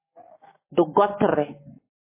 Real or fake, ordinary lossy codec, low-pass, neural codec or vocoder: real; MP3, 16 kbps; 3.6 kHz; none